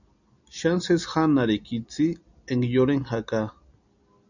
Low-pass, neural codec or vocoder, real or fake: 7.2 kHz; none; real